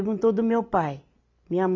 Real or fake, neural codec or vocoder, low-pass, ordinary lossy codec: real; none; 7.2 kHz; MP3, 64 kbps